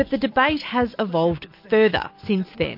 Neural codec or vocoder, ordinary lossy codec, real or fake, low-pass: none; MP3, 32 kbps; real; 5.4 kHz